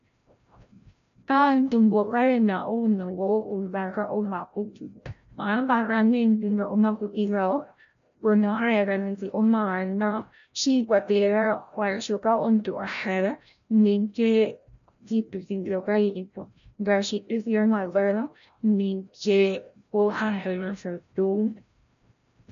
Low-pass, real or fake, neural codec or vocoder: 7.2 kHz; fake; codec, 16 kHz, 0.5 kbps, FreqCodec, larger model